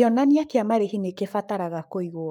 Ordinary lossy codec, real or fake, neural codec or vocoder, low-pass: none; fake; codec, 44.1 kHz, 7.8 kbps, Pupu-Codec; 19.8 kHz